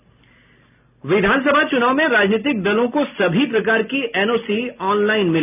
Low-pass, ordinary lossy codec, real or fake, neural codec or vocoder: 3.6 kHz; none; real; none